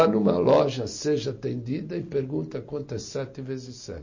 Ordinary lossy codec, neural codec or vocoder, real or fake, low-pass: MP3, 32 kbps; none; real; 7.2 kHz